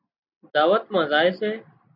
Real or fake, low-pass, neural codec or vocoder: real; 5.4 kHz; none